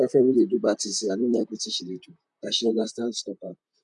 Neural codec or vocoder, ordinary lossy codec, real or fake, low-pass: vocoder, 44.1 kHz, 128 mel bands, Pupu-Vocoder; none; fake; 10.8 kHz